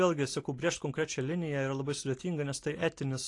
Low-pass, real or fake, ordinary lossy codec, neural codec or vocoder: 10.8 kHz; real; AAC, 48 kbps; none